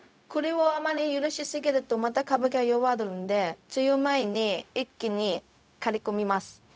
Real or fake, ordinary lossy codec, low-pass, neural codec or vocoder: fake; none; none; codec, 16 kHz, 0.4 kbps, LongCat-Audio-Codec